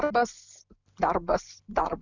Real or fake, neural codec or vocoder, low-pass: real; none; 7.2 kHz